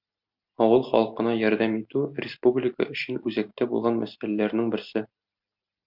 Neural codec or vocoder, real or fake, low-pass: none; real; 5.4 kHz